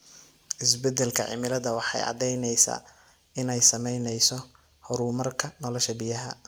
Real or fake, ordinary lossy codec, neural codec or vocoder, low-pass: real; none; none; none